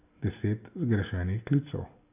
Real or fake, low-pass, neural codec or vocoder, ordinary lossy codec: real; 3.6 kHz; none; none